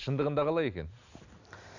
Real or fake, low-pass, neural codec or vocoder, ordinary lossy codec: real; 7.2 kHz; none; none